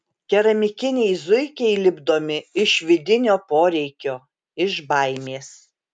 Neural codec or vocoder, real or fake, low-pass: none; real; 9.9 kHz